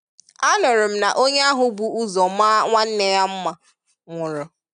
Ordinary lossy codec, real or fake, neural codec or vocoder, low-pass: none; real; none; 9.9 kHz